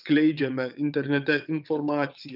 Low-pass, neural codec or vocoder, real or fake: 5.4 kHz; vocoder, 22.05 kHz, 80 mel bands, WaveNeXt; fake